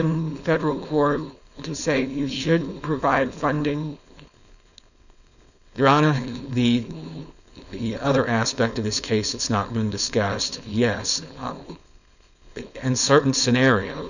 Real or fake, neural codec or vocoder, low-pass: fake; codec, 16 kHz, 4.8 kbps, FACodec; 7.2 kHz